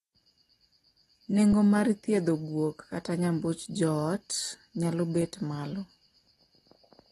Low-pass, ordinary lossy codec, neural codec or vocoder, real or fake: 19.8 kHz; AAC, 32 kbps; none; real